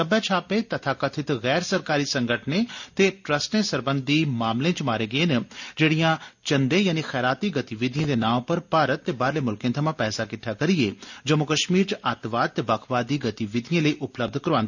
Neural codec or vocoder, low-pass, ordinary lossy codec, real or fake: none; 7.2 kHz; none; real